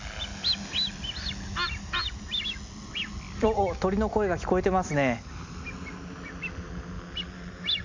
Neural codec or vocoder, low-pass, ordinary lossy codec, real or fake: none; 7.2 kHz; MP3, 64 kbps; real